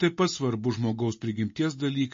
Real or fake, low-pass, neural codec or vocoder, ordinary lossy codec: real; 7.2 kHz; none; MP3, 32 kbps